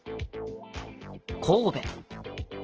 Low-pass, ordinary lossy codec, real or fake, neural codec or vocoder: 7.2 kHz; Opus, 16 kbps; real; none